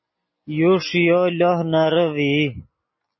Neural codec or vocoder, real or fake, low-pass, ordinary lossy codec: none; real; 7.2 kHz; MP3, 24 kbps